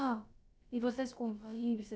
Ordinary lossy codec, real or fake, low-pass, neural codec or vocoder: none; fake; none; codec, 16 kHz, about 1 kbps, DyCAST, with the encoder's durations